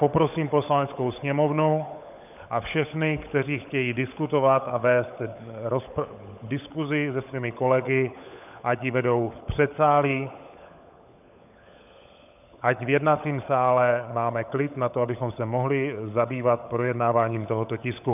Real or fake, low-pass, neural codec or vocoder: fake; 3.6 kHz; codec, 16 kHz, 16 kbps, FunCodec, trained on LibriTTS, 50 frames a second